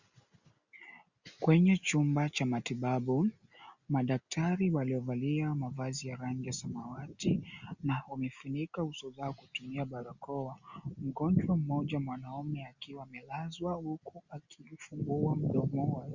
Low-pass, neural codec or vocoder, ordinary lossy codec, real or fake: 7.2 kHz; none; Opus, 64 kbps; real